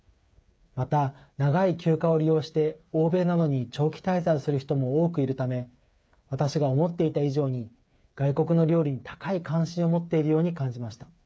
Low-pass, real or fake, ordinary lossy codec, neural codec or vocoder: none; fake; none; codec, 16 kHz, 8 kbps, FreqCodec, smaller model